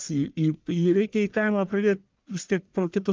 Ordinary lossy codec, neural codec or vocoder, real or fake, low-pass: Opus, 24 kbps; codec, 44.1 kHz, 1.7 kbps, Pupu-Codec; fake; 7.2 kHz